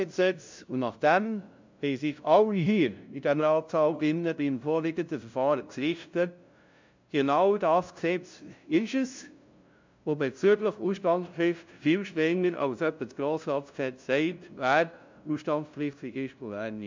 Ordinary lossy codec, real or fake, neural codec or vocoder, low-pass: MP3, 64 kbps; fake; codec, 16 kHz, 0.5 kbps, FunCodec, trained on LibriTTS, 25 frames a second; 7.2 kHz